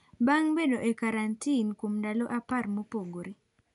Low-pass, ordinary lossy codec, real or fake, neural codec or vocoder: 10.8 kHz; none; real; none